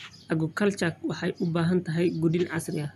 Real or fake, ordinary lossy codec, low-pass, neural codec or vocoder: real; none; 14.4 kHz; none